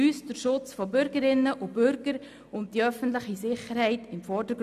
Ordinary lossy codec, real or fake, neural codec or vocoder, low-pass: none; real; none; 14.4 kHz